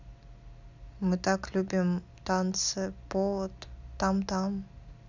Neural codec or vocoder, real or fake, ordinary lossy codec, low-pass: none; real; none; 7.2 kHz